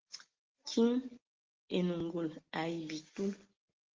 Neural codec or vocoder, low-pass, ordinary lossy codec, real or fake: none; 7.2 kHz; Opus, 16 kbps; real